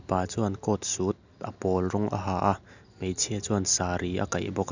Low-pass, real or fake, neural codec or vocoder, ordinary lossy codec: 7.2 kHz; real; none; none